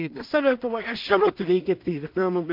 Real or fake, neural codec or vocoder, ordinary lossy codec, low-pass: fake; codec, 16 kHz in and 24 kHz out, 0.4 kbps, LongCat-Audio-Codec, two codebook decoder; MP3, 48 kbps; 5.4 kHz